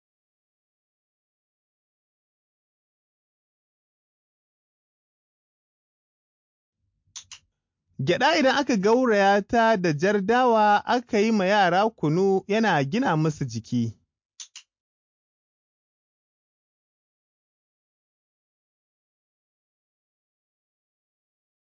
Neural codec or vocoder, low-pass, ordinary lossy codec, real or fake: none; 7.2 kHz; MP3, 48 kbps; real